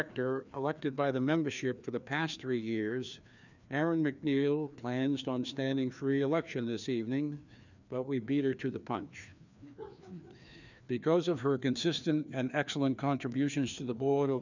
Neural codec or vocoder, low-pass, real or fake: codec, 16 kHz, 2 kbps, FreqCodec, larger model; 7.2 kHz; fake